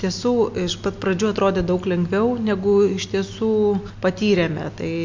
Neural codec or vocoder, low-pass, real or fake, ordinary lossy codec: none; 7.2 kHz; real; MP3, 48 kbps